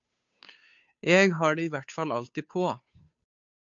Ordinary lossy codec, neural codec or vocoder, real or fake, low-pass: MP3, 64 kbps; codec, 16 kHz, 2 kbps, FunCodec, trained on Chinese and English, 25 frames a second; fake; 7.2 kHz